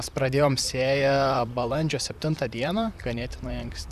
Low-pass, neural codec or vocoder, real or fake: 14.4 kHz; vocoder, 44.1 kHz, 128 mel bands, Pupu-Vocoder; fake